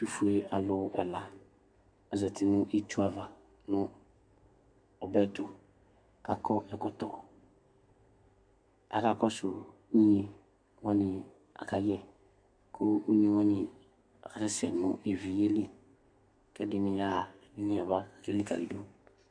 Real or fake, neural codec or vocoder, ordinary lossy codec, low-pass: fake; codec, 32 kHz, 1.9 kbps, SNAC; MP3, 64 kbps; 9.9 kHz